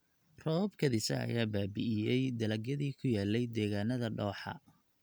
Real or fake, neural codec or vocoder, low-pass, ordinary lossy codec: real; none; none; none